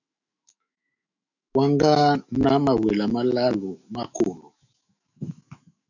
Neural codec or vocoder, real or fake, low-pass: autoencoder, 48 kHz, 128 numbers a frame, DAC-VAE, trained on Japanese speech; fake; 7.2 kHz